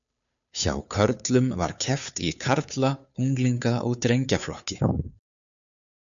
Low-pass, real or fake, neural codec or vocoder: 7.2 kHz; fake; codec, 16 kHz, 2 kbps, FunCodec, trained on Chinese and English, 25 frames a second